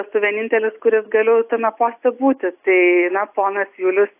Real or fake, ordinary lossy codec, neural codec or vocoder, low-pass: real; AAC, 32 kbps; none; 3.6 kHz